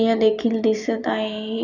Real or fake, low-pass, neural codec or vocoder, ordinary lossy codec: real; 7.2 kHz; none; none